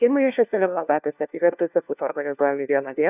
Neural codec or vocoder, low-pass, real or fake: codec, 16 kHz, 1 kbps, FunCodec, trained on LibriTTS, 50 frames a second; 3.6 kHz; fake